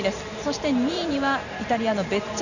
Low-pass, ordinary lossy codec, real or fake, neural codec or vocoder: 7.2 kHz; none; fake; vocoder, 44.1 kHz, 128 mel bands every 512 samples, BigVGAN v2